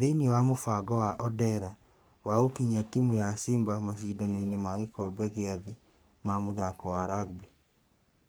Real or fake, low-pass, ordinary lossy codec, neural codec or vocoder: fake; none; none; codec, 44.1 kHz, 3.4 kbps, Pupu-Codec